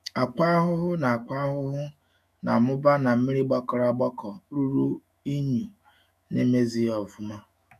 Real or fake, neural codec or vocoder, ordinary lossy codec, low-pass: fake; autoencoder, 48 kHz, 128 numbers a frame, DAC-VAE, trained on Japanese speech; none; 14.4 kHz